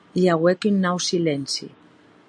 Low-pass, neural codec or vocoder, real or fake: 9.9 kHz; none; real